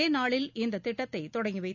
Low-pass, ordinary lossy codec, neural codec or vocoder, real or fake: 7.2 kHz; none; none; real